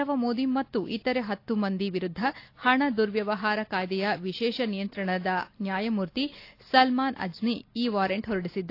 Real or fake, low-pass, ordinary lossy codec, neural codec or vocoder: real; 5.4 kHz; AAC, 32 kbps; none